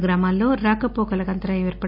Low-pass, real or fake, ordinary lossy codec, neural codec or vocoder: 5.4 kHz; real; none; none